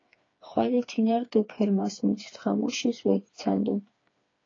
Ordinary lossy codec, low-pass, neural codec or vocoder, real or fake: AAC, 32 kbps; 7.2 kHz; codec, 16 kHz, 4 kbps, FreqCodec, smaller model; fake